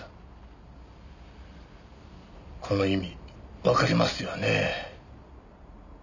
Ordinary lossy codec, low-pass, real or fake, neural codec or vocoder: none; 7.2 kHz; real; none